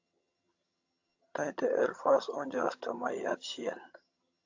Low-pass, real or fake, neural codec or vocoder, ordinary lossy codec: 7.2 kHz; fake; vocoder, 22.05 kHz, 80 mel bands, HiFi-GAN; AAC, 48 kbps